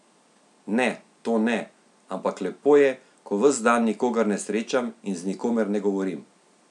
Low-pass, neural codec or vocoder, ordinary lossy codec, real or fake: 10.8 kHz; none; none; real